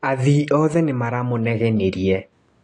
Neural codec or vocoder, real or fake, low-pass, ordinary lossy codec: none; real; 10.8 kHz; AAC, 48 kbps